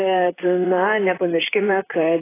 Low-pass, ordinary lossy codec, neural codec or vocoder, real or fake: 3.6 kHz; AAC, 16 kbps; vocoder, 44.1 kHz, 128 mel bands, Pupu-Vocoder; fake